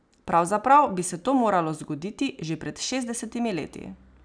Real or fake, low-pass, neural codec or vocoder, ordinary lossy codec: real; 9.9 kHz; none; none